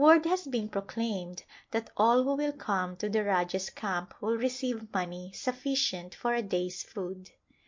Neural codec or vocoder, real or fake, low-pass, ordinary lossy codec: none; real; 7.2 kHz; MP3, 48 kbps